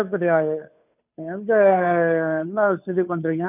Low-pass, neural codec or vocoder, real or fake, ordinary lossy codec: 3.6 kHz; codec, 16 kHz, 2 kbps, FunCodec, trained on Chinese and English, 25 frames a second; fake; none